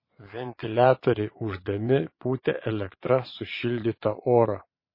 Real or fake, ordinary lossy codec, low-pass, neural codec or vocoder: fake; MP3, 24 kbps; 5.4 kHz; vocoder, 22.05 kHz, 80 mel bands, Vocos